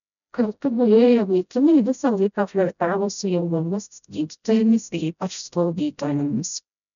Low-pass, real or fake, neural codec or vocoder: 7.2 kHz; fake; codec, 16 kHz, 0.5 kbps, FreqCodec, smaller model